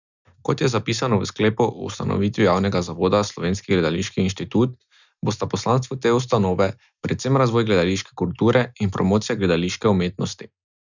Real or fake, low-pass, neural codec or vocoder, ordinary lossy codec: real; 7.2 kHz; none; none